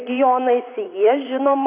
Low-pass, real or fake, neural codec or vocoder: 3.6 kHz; fake; vocoder, 44.1 kHz, 128 mel bands, Pupu-Vocoder